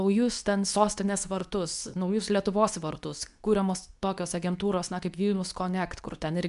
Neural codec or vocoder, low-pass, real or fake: codec, 24 kHz, 0.9 kbps, WavTokenizer, medium speech release version 2; 10.8 kHz; fake